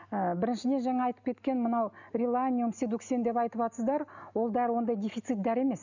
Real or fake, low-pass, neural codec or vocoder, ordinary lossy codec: real; 7.2 kHz; none; none